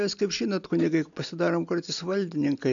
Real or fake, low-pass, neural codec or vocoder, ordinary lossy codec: real; 7.2 kHz; none; AAC, 64 kbps